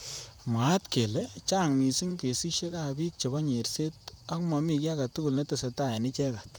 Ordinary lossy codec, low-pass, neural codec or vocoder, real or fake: none; none; vocoder, 44.1 kHz, 128 mel bands, Pupu-Vocoder; fake